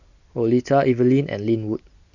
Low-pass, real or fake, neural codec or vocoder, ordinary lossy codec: 7.2 kHz; real; none; none